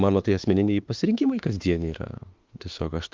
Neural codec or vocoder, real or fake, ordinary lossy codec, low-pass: autoencoder, 48 kHz, 32 numbers a frame, DAC-VAE, trained on Japanese speech; fake; Opus, 32 kbps; 7.2 kHz